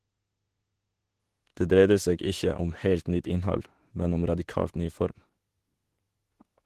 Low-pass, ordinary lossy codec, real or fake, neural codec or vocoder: 14.4 kHz; Opus, 16 kbps; fake; autoencoder, 48 kHz, 32 numbers a frame, DAC-VAE, trained on Japanese speech